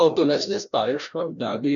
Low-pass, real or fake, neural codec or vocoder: 7.2 kHz; fake; codec, 16 kHz, 1 kbps, FreqCodec, larger model